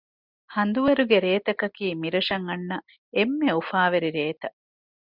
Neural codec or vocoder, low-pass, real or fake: none; 5.4 kHz; real